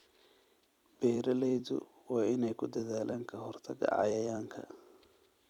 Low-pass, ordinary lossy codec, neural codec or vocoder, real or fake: 19.8 kHz; none; vocoder, 44.1 kHz, 128 mel bands every 256 samples, BigVGAN v2; fake